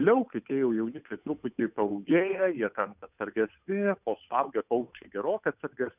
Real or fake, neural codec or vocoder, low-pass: fake; codec, 16 kHz, 8 kbps, FunCodec, trained on Chinese and English, 25 frames a second; 3.6 kHz